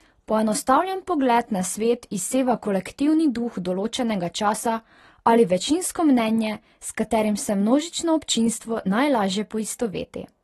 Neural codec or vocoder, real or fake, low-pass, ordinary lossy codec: none; real; 19.8 kHz; AAC, 32 kbps